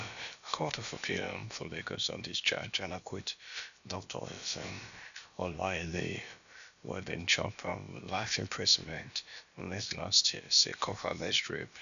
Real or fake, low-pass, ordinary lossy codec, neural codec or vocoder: fake; 7.2 kHz; none; codec, 16 kHz, about 1 kbps, DyCAST, with the encoder's durations